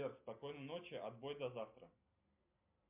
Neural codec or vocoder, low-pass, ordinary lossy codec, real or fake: none; 3.6 kHz; MP3, 32 kbps; real